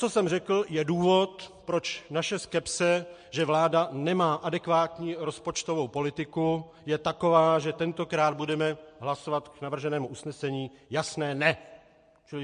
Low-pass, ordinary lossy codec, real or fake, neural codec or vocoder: 9.9 kHz; MP3, 48 kbps; real; none